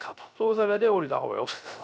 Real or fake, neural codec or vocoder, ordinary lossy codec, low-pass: fake; codec, 16 kHz, 0.3 kbps, FocalCodec; none; none